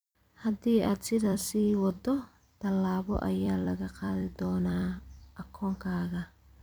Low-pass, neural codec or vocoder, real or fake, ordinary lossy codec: none; vocoder, 44.1 kHz, 128 mel bands every 256 samples, BigVGAN v2; fake; none